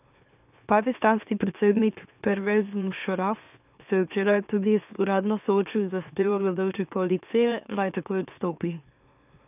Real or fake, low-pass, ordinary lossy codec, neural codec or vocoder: fake; 3.6 kHz; none; autoencoder, 44.1 kHz, a latent of 192 numbers a frame, MeloTTS